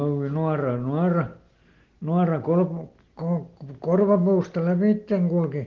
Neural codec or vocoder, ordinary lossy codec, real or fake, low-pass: none; Opus, 16 kbps; real; 7.2 kHz